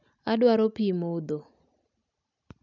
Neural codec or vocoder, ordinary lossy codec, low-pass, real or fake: none; none; 7.2 kHz; real